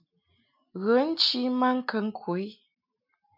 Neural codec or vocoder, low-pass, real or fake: none; 5.4 kHz; real